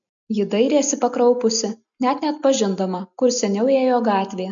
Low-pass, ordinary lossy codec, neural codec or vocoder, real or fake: 7.2 kHz; MP3, 64 kbps; none; real